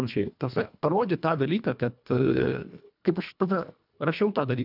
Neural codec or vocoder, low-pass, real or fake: codec, 24 kHz, 1.5 kbps, HILCodec; 5.4 kHz; fake